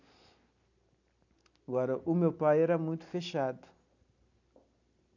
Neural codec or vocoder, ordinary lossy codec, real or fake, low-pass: none; none; real; 7.2 kHz